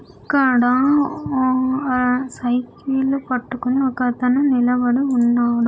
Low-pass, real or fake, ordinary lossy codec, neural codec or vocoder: none; real; none; none